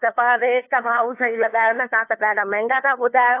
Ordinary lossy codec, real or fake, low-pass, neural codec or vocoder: AAC, 24 kbps; fake; 3.6 kHz; codec, 16 kHz, 2 kbps, FunCodec, trained on LibriTTS, 25 frames a second